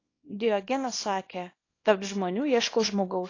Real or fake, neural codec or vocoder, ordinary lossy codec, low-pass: fake; codec, 24 kHz, 0.9 kbps, WavTokenizer, small release; AAC, 32 kbps; 7.2 kHz